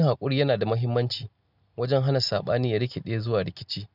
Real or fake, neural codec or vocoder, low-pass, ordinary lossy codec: real; none; 5.4 kHz; none